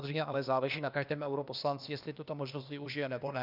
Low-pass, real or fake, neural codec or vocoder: 5.4 kHz; fake; codec, 16 kHz, 0.8 kbps, ZipCodec